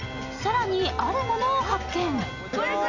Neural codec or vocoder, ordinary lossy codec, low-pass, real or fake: none; none; 7.2 kHz; real